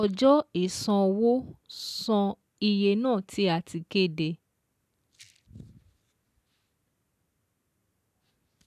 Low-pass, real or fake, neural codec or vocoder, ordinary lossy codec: 14.4 kHz; real; none; none